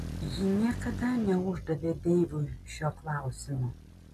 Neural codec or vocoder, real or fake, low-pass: none; real; 14.4 kHz